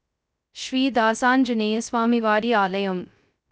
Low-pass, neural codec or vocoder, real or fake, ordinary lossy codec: none; codec, 16 kHz, 0.2 kbps, FocalCodec; fake; none